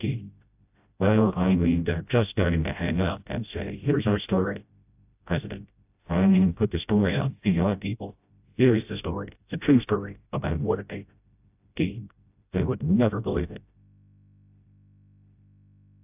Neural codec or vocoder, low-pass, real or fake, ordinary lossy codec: codec, 16 kHz, 0.5 kbps, FreqCodec, smaller model; 3.6 kHz; fake; AAC, 32 kbps